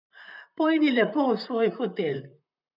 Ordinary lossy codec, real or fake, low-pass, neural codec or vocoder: none; fake; 5.4 kHz; vocoder, 44.1 kHz, 128 mel bands, Pupu-Vocoder